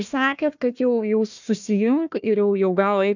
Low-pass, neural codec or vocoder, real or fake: 7.2 kHz; codec, 16 kHz, 1 kbps, FunCodec, trained on Chinese and English, 50 frames a second; fake